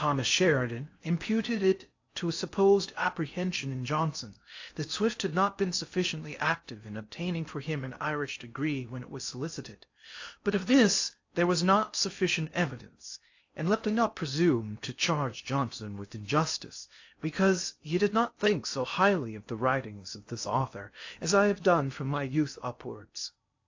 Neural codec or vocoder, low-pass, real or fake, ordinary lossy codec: codec, 16 kHz in and 24 kHz out, 0.6 kbps, FocalCodec, streaming, 4096 codes; 7.2 kHz; fake; AAC, 48 kbps